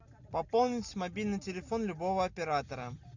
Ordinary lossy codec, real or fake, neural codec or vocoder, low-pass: MP3, 64 kbps; real; none; 7.2 kHz